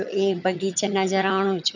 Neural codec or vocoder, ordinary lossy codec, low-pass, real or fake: vocoder, 22.05 kHz, 80 mel bands, HiFi-GAN; none; 7.2 kHz; fake